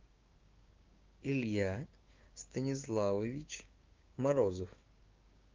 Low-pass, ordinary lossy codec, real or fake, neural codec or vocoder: 7.2 kHz; Opus, 24 kbps; fake; codec, 16 kHz, 6 kbps, DAC